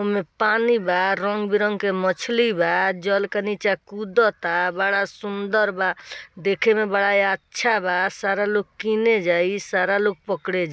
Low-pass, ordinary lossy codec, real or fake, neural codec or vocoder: none; none; real; none